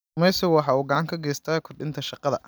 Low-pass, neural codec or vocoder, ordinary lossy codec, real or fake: none; none; none; real